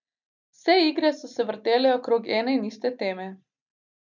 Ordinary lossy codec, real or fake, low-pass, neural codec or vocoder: none; real; 7.2 kHz; none